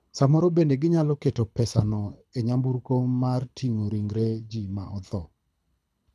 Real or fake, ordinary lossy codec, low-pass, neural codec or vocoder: fake; none; none; codec, 24 kHz, 6 kbps, HILCodec